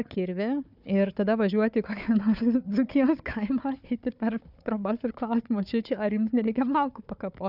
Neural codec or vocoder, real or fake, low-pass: codec, 16 kHz, 4 kbps, FunCodec, trained on Chinese and English, 50 frames a second; fake; 5.4 kHz